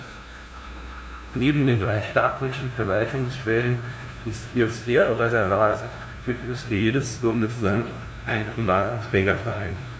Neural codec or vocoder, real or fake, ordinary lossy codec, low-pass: codec, 16 kHz, 0.5 kbps, FunCodec, trained on LibriTTS, 25 frames a second; fake; none; none